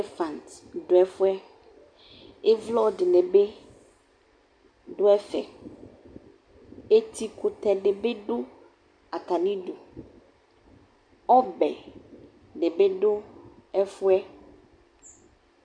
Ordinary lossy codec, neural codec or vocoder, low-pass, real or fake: MP3, 96 kbps; none; 9.9 kHz; real